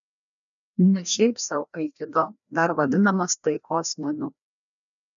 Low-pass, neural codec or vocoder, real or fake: 7.2 kHz; codec, 16 kHz, 1 kbps, FreqCodec, larger model; fake